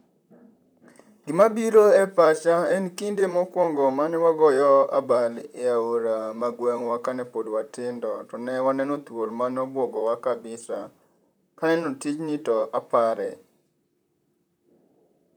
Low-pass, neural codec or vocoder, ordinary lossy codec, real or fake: none; vocoder, 44.1 kHz, 128 mel bands, Pupu-Vocoder; none; fake